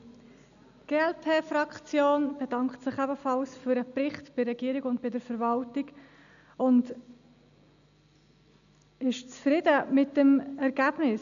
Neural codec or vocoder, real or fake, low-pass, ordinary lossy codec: none; real; 7.2 kHz; none